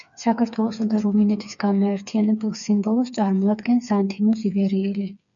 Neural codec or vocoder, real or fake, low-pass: codec, 16 kHz, 4 kbps, FreqCodec, smaller model; fake; 7.2 kHz